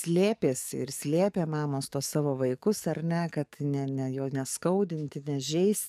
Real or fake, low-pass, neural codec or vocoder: fake; 14.4 kHz; codec, 44.1 kHz, 7.8 kbps, DAC